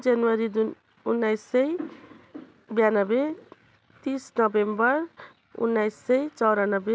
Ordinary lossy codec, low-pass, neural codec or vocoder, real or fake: none; none; none; real